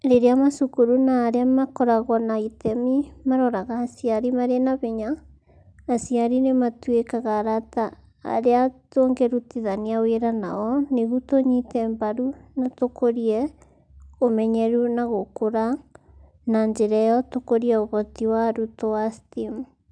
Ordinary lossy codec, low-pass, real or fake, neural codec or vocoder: none; 9.9 kHz; real; none